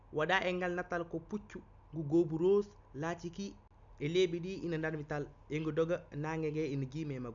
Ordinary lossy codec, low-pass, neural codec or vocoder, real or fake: none; 7.2 kHz; none; real